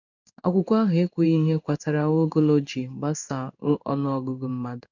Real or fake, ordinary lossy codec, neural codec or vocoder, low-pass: fake; none; codec, 16 kHz in and 24 kHz out, 1 kbps, XY-Tokenizer; 7.2 kHz